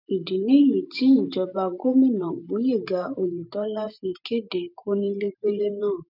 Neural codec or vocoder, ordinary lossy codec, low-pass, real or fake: vocoder, 44.1 kHz, 128 mel bands every 512 samples, BigVGAN v2; none; 5.4 kHz; fake